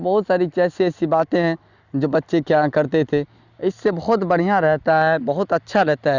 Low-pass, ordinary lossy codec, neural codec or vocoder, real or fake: 7.2 kHz; Opus, 64 kbps; none; real